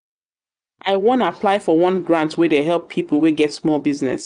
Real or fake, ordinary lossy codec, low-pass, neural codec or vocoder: fake; none; 9.9 kHz; vocoder, 22.05 kHz, 80 mel bands, WaveNeXt